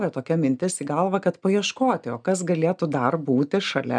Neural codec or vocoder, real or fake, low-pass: none; real; 9.9 kHz